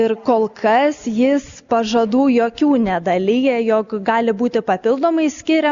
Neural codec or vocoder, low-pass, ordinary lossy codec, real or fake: none; 7.2 kHz; Opus, 64 kbps; real